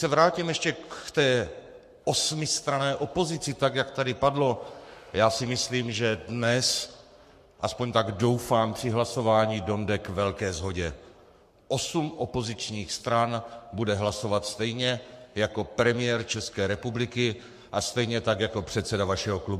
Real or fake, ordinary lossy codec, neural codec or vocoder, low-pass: fake; MP3, 64 kbps; codec, 44.1 kHz, 7.8 kbps, Pupu-Codec; 14.4 kHz